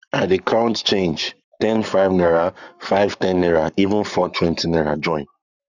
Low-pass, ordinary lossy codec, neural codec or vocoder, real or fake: 7.2 kHz; none; codec, 44.1 kHz, 7.8 kbps, Pupu-Codec; fake